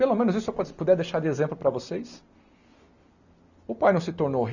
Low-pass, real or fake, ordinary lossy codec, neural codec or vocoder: 7.2 kHz; real; none; none